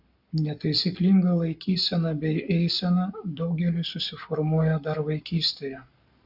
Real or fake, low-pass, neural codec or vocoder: fake; 5.4 kHz; codec, 44.1 kHz, 7.8 kbps, Pupu-Codec